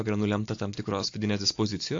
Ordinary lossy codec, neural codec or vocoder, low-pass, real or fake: AAC, 48 kbps; none; 7.2 kHz; real